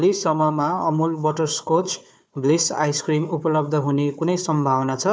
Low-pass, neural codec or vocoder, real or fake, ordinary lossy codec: none; codec, 16 kHz, 4 kbps, FunCodec, trained on Chinese and English, 50 frames a second; fake; none